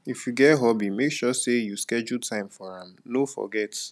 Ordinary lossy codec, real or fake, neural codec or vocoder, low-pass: none; real; none; none